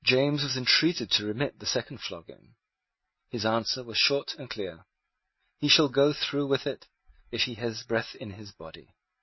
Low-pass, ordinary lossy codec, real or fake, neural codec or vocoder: 7.2 kHz; MP3, 24 kbps; real; none